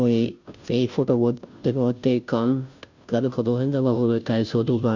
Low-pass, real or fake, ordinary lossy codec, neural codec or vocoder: 7.2 kHz; fake; none; codec, 16 kHz, 0.5 kbps, FunCodec, trained on Chinese and English, 25 frames a second